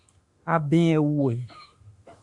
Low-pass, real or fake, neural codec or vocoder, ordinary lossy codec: 10.8 kHz; fake; autoencoder, 48 kHz, 32 numbers a frame, DAC-VAE, trained on Japanese speech; Opus, 64 kbps